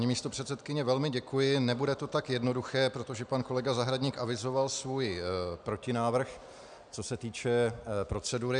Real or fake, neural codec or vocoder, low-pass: real; none; 9.9 kHz